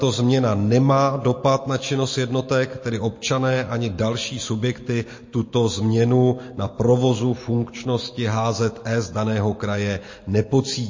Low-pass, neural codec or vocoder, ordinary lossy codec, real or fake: 7.2 kHz; none; MP3, 32 kbps; real